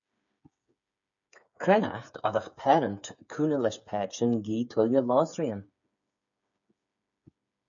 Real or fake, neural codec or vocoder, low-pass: fake; codec, 16 kHz, 8 kbps, FreqCodec, smaller model; 7.2 kHz